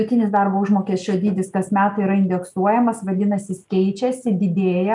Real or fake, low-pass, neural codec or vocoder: real; 10.8 kHz; none